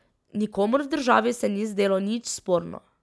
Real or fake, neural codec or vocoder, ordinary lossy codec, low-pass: real; none; none; none